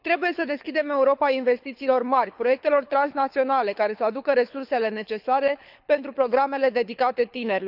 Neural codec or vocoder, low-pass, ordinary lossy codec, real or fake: codec, 24 kHz, 6 kbps, HILCodec; 5.4 kHz; none; fake